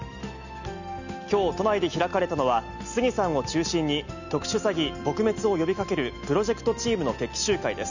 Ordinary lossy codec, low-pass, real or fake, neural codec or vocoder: none; 7.2 kHz; real; none